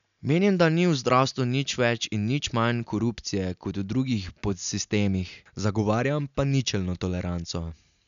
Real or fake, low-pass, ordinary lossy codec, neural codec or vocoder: real; 7.2 kHz; none; none